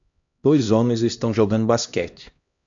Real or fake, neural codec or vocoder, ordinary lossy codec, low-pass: fake; codec, 16 kHz, 1 kbps, X-Codec, HuBERT features, trained on LibriSpeech; MP3, 64 kbps; 7.2 kHz